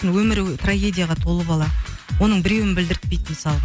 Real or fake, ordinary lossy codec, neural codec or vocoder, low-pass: real; none; none; none